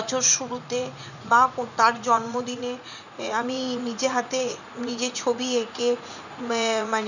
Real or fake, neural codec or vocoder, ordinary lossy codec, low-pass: fake; vocoder, 44.1 kHz, 80 mel bands, Vocos; none; 7.2 kHz